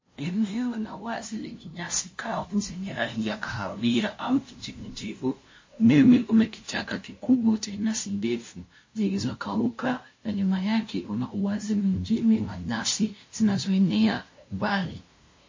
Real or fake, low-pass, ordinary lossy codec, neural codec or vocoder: fake; 7.2 kHz; MP3, 32 kbps; codec, 16 kHz, 0.5 kbps, FunCodec, trained on LibriTTS, 25 frames a second